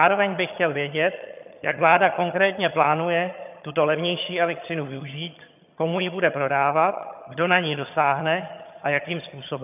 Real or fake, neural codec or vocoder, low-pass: fake; vocoder, 22.05 kHz, 80 mel bands, HiFi-GAN; 3.6 kHz